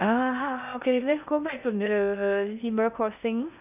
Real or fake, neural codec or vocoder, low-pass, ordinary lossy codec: fake; codec, 16 kHz in and 24 kHz out, 0.6 kbps, FocalCodec, streaming, 2048 codes; 3.6 kHz; none